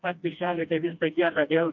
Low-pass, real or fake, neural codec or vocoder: 7.2 kHz; fake; codec, 16 kHz, 1 kbps, FreqCodec, smaller model